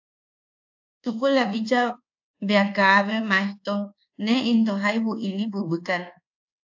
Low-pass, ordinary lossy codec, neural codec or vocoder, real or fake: 7.2 kHz; AAC, 48 kbps; codec, 24 kHz, 1.2 kbps, DualCodec; fake